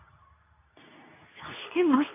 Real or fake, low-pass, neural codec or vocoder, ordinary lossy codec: fake; 3.6 kHz; codec, 24 kHz, 0.9 kbps, WavTokenizer, medium speech release version 2; none